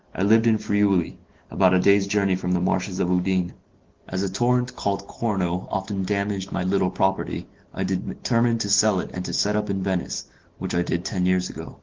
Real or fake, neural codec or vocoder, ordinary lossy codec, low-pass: real; none; Opus, 16 kbps; 7.2 kHz